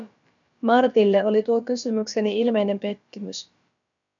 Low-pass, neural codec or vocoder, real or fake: 7.2 kHz; codec, 16 kHz, about 1 kbps, DyCAST, with the encoder's durations; fake